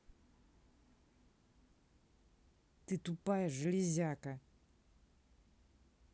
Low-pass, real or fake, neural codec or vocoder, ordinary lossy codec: none; real; none; none